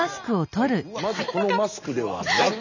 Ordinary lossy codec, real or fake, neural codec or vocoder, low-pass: none; real; none; 7.2 kHz